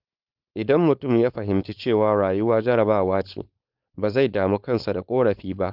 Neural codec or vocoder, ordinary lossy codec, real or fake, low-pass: codec, 16 kHz, 4.8 kbps, FACodec; Opus, 32 kbps; fake; 5.4 kHz